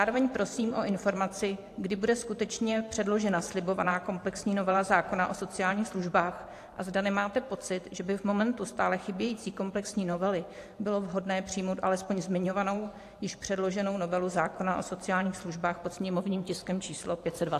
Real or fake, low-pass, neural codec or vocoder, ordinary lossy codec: fake; 14.4 kHz; vocoder, 44.1 kHz, 128 mel bands every 512 samples, BigVGAN v2; AAC, 64 kbps